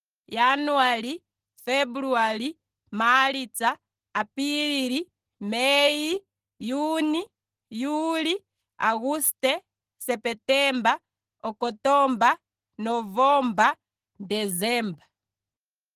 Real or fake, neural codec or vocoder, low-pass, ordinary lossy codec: real; none; 14.4 kHz; Opus, 16 kbps